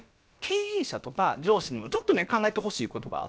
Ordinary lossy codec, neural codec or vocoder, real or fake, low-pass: none; codec, 16 kHz, about 1 kbps, DyCAST, with the encoder's durations; fake; none